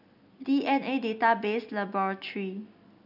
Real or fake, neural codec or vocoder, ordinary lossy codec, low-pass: real; none; none; 5.4 kHz